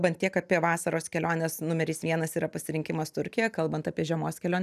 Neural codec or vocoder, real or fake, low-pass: none; real; 14.4 kHz